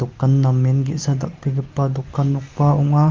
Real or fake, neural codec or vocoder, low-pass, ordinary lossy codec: real; none; 7.2 kHz; Opus, 32 kbps